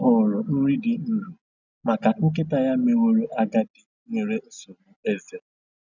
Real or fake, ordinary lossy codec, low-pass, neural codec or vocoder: real; none; 7.2 kHz; none